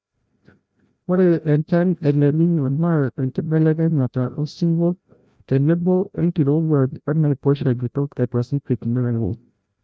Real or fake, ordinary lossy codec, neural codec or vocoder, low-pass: fake; none; codec, 16 kHz, 0.5 kbps, FreqCodec, larger model; none